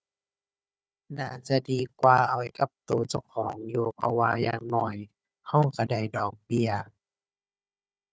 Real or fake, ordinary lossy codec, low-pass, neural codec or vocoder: fake; none; none; codec, 16 kHz, 4 kbps, FunCodec, trained on Chinese and English, 50 frames a second